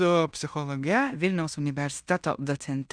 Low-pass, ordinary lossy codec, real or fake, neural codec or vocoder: 9.9 kHz; Opus, 64 kbps; fake; codec, 16 kHz in and 24 kHz out, 0.9 kbps, LongCat-Audio-Codec, fine tuned four codebook decoder